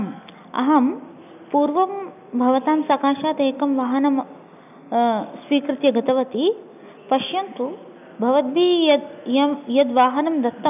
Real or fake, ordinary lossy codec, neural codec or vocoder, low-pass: real; none; none; 3.6 kHz